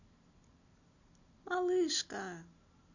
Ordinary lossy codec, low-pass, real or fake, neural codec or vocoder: none; 7.2 kHz; real; none